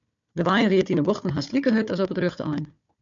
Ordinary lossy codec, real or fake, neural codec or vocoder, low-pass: MP3, 64 kbps; fake; codec, 16 kHz, 4 kbps, FunCodec, trained on Chinese and English, 50 frames a second; 7.2 kHz